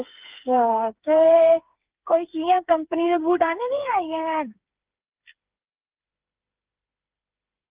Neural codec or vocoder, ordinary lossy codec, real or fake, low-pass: codec, 16 kHz, 4 kbps, FreqCodec, smaller model; Opus, 32 kbps; fake; 3.6 kHz